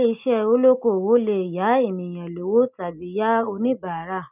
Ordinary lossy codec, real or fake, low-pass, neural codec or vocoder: none; real; 3.6 kHz; none